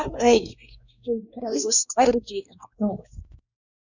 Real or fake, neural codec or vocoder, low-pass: fake; codec, 16 kHz, 1 kbps, X-Codec, WavLM features, trained on Multilingual LibriSpeech; 7.2 kHz